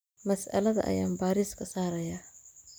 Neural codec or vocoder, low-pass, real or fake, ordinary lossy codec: none; none; real; none